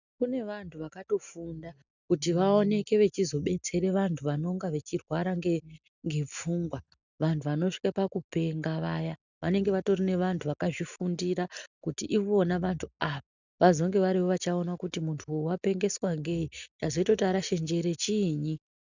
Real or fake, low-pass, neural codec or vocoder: real; 7.2 kHz; none